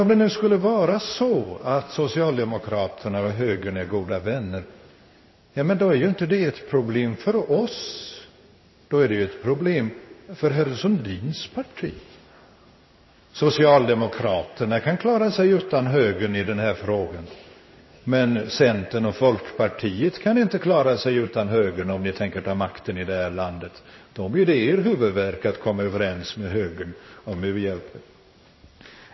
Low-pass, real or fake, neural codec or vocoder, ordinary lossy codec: 7.2 kHz; fake; codec, 16 kHz in and 24 kHz out, 1 kbps, XY-Tokenizer; MP3, 24 kbps